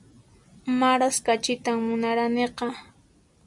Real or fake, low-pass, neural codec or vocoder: real; 10.8 kHz; none